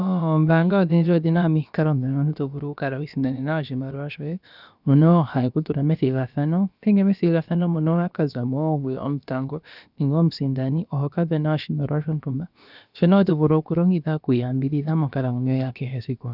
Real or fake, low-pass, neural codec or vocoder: fake; 5.4 kHz; codec, 16 kHz, about 1 kbps, DyCAST, with the encoder's durations